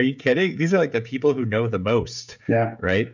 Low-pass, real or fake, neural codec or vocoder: 7.2 kHz; fake; codec, 16 kHz, 16 kbps, FreqCodec, smaller model